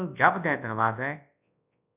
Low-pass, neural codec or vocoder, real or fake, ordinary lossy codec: 3.6 kHz; codec, 24 kHz, 0.9 kbps, WavTokenizer, large speech release; fake; AAC, 32 kbps